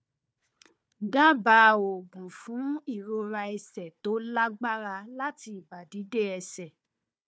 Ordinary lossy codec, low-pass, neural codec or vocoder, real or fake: none; none; codec, 16 kHz, 4 kbps, FunCodec, trained on Chinese and English, 50 frames a second; fake